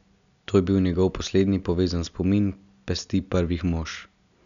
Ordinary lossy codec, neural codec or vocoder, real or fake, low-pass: none; none; real; 7.2 kHz